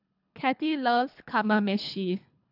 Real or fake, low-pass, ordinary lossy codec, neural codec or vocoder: fake; 5.4 kHz; AAC, 48 kbps; codec, 24 kHz, 3 kbps, HILCodec